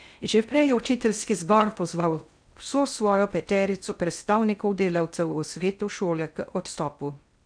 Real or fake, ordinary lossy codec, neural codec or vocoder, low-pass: fake; none; codec, 16 kHz in and 24 kHz out, 0.6 kbps, FocalCodec, streaming, 4096 codes; 9.9 kHz